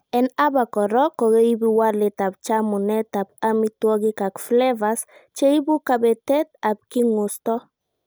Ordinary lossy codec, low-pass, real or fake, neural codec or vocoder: none; none; real; none